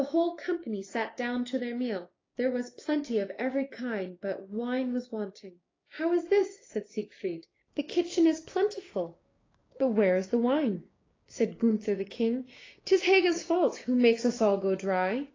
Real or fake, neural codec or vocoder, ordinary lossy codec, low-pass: fake; codec, 16 kHz, 6 kbps, DAC; AAC, 32 kbps; 7.2 kHz